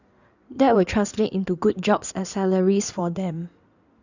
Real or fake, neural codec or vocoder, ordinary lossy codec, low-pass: fake; codec, 16 kHz in and 24 kHz out, 2.2 kbps, FireRedTTS-2 codec; none; 7.2 kHz